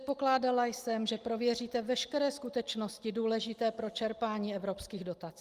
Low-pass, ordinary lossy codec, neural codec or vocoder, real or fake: 14.4 kHz; Opus, 32 kbps; none; real